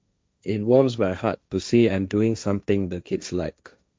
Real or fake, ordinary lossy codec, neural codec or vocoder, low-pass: fake; none; codec, 16 kHz, 1.1 kbps, Voila-Tokenizer; none